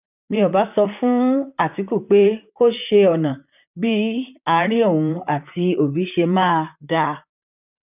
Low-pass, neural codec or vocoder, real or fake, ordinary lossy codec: 3.6 kHz; vocoder, 44.1 kHz, 128 mel bands, Pupu-Vocoder; fake; none